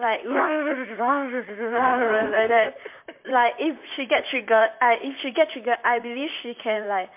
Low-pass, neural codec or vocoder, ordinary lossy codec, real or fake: 3.6 kHz; vocoder, 44.1 kHz, 128 mel bands, Pupu-Vocoder; MP3, 32 kbps; fake